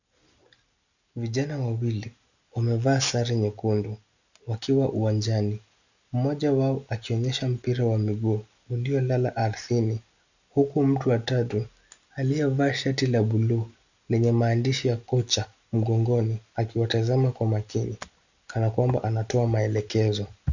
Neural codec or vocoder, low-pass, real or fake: none; 7.2 kHz; real